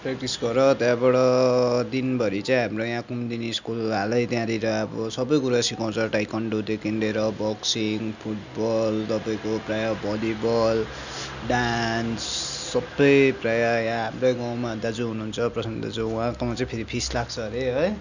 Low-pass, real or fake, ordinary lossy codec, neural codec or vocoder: 7.2 kHz; real; none; none